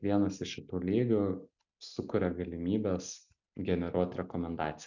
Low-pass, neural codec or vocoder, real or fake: 7.2 kHz; none; real